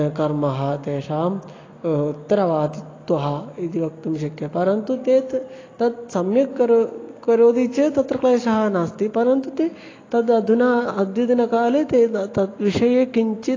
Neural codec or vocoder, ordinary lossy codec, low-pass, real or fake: none; AAC, 32 kbps; 7.2 kHz; real